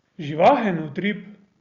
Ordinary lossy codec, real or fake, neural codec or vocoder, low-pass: Opus, 64 kbps; real; none; 7.2 kHz